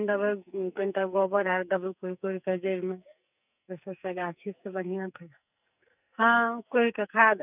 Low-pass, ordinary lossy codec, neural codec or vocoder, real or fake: 3.6 kHz; none; codec, 44.1 kHz, 2.6 kbps, SNAC; fake